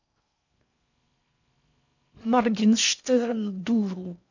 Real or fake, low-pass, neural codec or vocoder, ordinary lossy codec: fake; 7.2 kHz; codec, 16 kHz in and 24 kHz out, 0.6 kbps, FocalCodec, streaming, 4096 codes; none